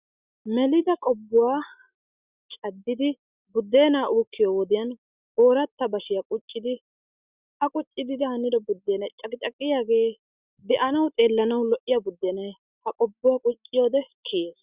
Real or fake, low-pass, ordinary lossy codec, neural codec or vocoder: real; 3.6 kHz; Opus, 64 kbps; none